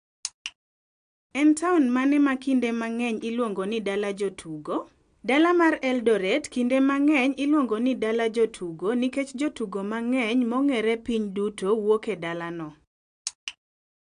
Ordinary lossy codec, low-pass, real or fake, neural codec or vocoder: AAC, 64 kbps; 9.9 kHz; real; none